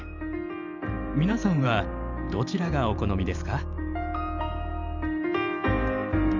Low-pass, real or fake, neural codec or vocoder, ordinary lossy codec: 7.2 kHz; fake; vocoder, 44.1 kHz, 128 mel bands every 512 samples, BigVGAN v2; none